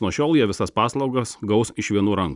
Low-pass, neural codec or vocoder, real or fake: 10.8 kHz; none; real